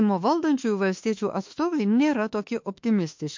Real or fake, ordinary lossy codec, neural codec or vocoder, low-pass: fake; MP3, 48 kbps; autoencoder, 48 kHz, 32 numbers a frame, DAC-VAE, trained on Japanese speech; 7.2 kHz